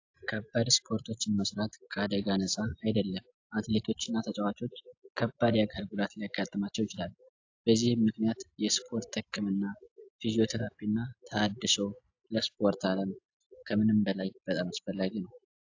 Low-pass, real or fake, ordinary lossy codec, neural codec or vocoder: 7.2 kHz; real; AAC, 48 kbps; none